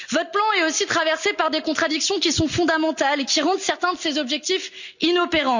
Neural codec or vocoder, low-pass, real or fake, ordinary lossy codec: none; 7.2 kHz; real; none